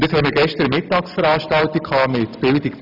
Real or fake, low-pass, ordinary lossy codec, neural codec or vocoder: real; 5.4 kHz; none; none